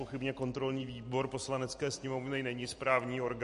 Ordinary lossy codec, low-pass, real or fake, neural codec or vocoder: MP3, 48 kbps; 14.4 kHz; real; none